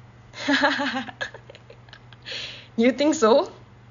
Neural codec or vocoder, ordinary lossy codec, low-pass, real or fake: none; none; 7.2 kHz; real